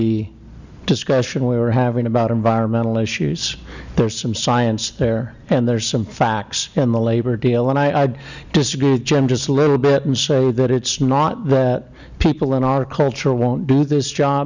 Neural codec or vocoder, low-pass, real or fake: none; 7.2 kHz; real